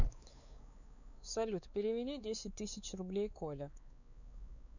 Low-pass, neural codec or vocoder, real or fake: 7.2 kHz; codec, 16 kHz, 4 kbps, X-Codec, WavLM features, trained on Multilingual LibriSpeech; fake